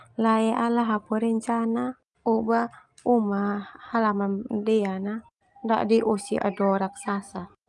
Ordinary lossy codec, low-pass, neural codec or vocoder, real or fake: Opus, 32 kbps; 10.8 kHz; none; real